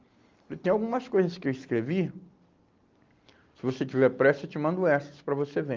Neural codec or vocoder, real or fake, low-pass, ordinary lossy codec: none; real; 7.2 kHz; Opus, 32 kbps